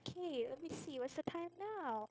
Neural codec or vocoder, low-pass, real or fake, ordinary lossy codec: codec, 16 kHz, 0.9 kbps, LongCat-Audio-Codec; none; fake; none